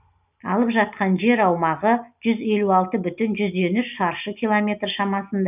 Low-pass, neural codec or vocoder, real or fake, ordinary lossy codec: 3.6 kHz; none; real; none